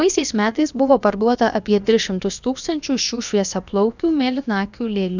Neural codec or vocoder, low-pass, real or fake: codec, 16 kHz, about 1 kbps, DyCAST, with the encoder's durations; 7.2 kHz; fake